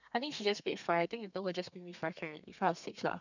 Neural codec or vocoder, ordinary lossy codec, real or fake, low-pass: codec, 32 kHz, 1.9 kbps, SNAC; none; fake; 7.2 kHz